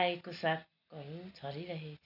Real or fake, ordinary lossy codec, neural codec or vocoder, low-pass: real; none; none; 5.4 kHz